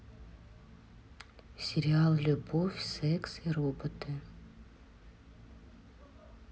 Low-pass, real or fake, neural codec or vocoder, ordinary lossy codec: none; real; none; none